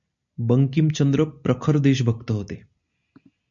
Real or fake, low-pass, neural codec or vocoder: real; 7.2 kHz; none